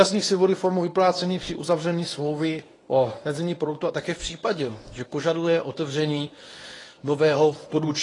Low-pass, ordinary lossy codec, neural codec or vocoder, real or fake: 10.8 kHz; AAC, 32 kbps; codec, 24 kHz, 0.9 kbps, WavTokenizer, medium speech release version 1; fake